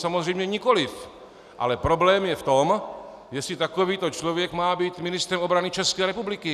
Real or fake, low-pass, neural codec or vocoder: real; 14.4 kHz; none